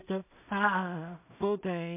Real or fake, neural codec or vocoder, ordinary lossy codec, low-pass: fake; codec, 16 kHz in and 24 kHz out, 0.4 kbps, LongCat-Audio-Codec, two codebook decoder; MP3, 32 kbps; 3.6 kHz